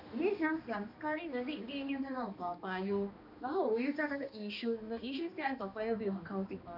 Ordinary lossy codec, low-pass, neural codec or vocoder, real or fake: none; 5.4 kHz; codec, 16 kHz, 2 kbps, X-Codec, HuBERT features, trained on balanced general audio; fake